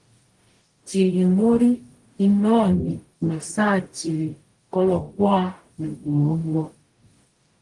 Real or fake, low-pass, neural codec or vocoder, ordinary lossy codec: fake; 10.8 kHz; codec, 44.1 kHz, 0.9 kbps, DAC; Opus, 24 kbps